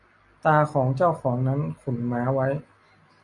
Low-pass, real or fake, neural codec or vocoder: 10.8 kHz; real; none